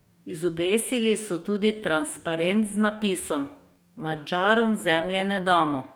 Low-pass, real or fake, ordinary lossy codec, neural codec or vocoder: none; fake; none; codec, 44.1 kHz, 2.6 kbps, DAC